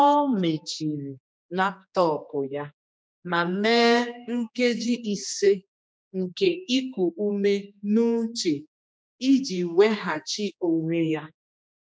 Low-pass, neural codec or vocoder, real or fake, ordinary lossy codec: none; codec, 16 kHz, 2 kbps, X-Codec, HuBERT features, trained on general audio; fake; none